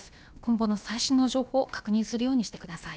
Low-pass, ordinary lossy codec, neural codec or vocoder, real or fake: none; none; codec, 16 kHz, about 1 kbps, DyCAST, with the encoder's durations; fake